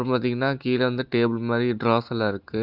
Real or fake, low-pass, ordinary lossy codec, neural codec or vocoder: real; 5.4 kHz; Opus, 32 kbps; none